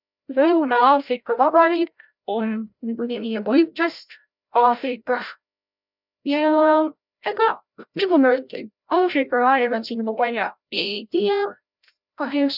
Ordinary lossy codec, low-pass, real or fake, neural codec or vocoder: none; 5.4 kHz; fake; codec, 16 kHz, 0.5 kbps, FreqCodec, larger model